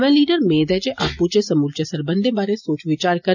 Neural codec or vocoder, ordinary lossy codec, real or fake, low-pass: none; none; real; 7.2 kHz